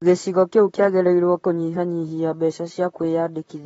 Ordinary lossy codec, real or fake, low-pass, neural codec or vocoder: AAC, 24 kbps; fake; 19.8 kHz; vocoder, 44.1 kHz, 128 mel bands, Pupu-Vocoder